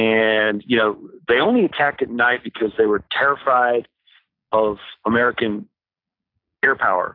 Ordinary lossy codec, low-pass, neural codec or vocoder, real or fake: AAC, 32 kbps; 5.4 kHz; none; real